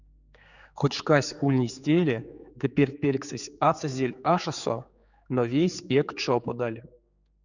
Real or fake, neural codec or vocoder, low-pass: fake; codec, 16 kHz, 4 kbps, X-Codec, HuBERT features, trained on general audio; 7.2 kHz